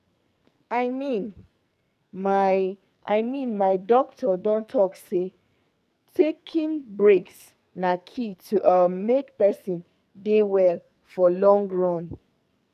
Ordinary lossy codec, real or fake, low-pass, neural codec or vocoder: none; fake; 14.4 kHz; codec, 44.1 kHz, 2.6 kbps, SNAC